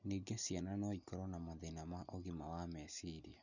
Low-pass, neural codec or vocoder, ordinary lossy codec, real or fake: 7.2 kHz; none; none; real